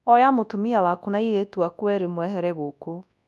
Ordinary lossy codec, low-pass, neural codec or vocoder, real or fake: none; none; codec, 24 kHz, 0.9 kbps, WavTokenizer, large speech release; fake